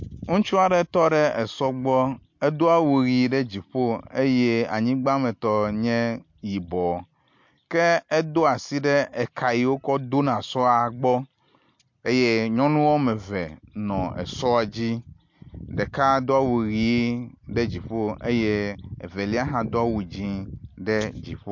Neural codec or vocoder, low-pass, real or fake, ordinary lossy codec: none; 7.2 kHz; real; MP3, 48 kbps